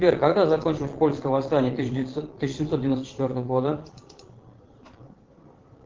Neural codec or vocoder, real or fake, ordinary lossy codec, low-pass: vocoder, 22.05 kHz, 80 mel bands, Vocos; fake; Opus, 16 kbps; 7.2 kHz